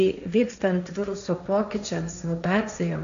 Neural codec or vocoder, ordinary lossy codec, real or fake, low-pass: codec, 16 kHz, 1.1 kbps, Voila-Tokenizer; AAC, 96 kbps; fake; 7.2 kHz